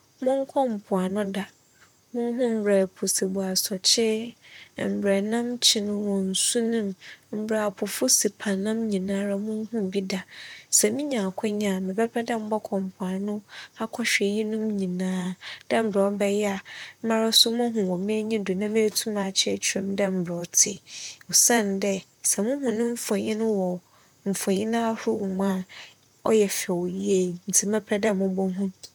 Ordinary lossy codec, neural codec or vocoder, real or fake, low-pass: none; vocoder, 44.1 kHz, 128 mel bands, Pupu-Vocoder; fake; 19.8 kHz